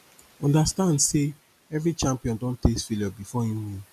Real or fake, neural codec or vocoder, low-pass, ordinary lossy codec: real; none; 14.4 kHz; none